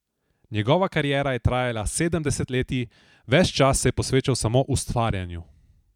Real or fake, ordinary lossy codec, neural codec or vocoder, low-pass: fake; none; vocoder, 44.1 kHz, 128 mel bands every 256 samples, BigVGAN v2; 19.8 kHz